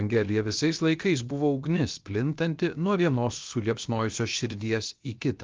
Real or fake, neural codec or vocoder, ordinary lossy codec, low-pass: fake; codec, 16 kHz, 0.7 kbps, FocalCodec; Opus, 24 kbps; 7.2 kHz